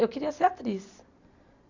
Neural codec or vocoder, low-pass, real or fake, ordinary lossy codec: none; 7.2 kHz; real; Opus, 64 kbps